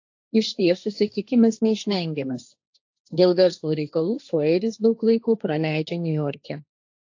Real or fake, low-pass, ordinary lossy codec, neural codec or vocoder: fake; 7.2 kHz; AAC, 48 kbps; codec, 16 kHz, 1.1 kbps, Voila-Tokenizer